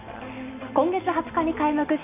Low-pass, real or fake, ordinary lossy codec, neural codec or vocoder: 3.6 kHz; real; none; none